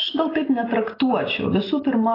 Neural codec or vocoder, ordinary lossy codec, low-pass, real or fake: none; AAC, 24 kbps; 5.4 kHz; real